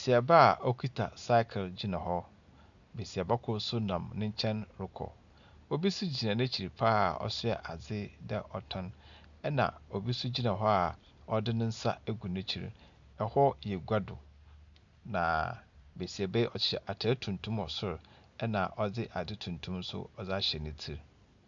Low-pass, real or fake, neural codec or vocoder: 7.2 kHz; real; none